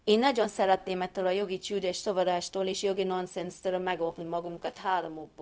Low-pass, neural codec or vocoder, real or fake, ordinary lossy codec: none; codec, 16 kHz, 0.4 kbps, LongCat-Audio-Codec; fake; none